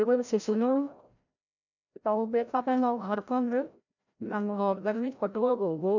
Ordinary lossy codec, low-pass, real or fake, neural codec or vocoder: none; 7.2 kHz; fake; codec, 16 kHz, 0.5 kbps, FreqCodec, larger model